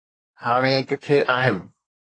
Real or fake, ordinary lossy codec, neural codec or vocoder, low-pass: fake; AAC, 32 kbps; codec, 24 kHz, 1 kbps, SNAC; 9.9 kHz